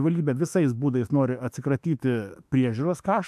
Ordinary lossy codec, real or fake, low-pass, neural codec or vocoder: AAC, 96 kbps; fake; 14.4 kHz; autoencoder, 48 kHz, 32 numbers a frame, DAC-VAE, trained on Japanese speech